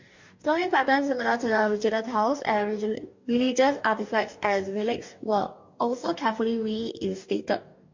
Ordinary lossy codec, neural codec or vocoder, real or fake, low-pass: MP3, 48 kbps; codec, 44.1 kHz, 2.6 kbps, DAC; fake; 7.2 kHz